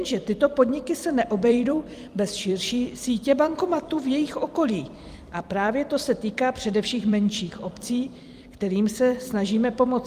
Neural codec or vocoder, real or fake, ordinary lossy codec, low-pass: vocoder, 44.1 kHz, 128 mel bands every 256 samples, BigVGAN v2; fake; Opus, 32 kbps; 14.4 kHz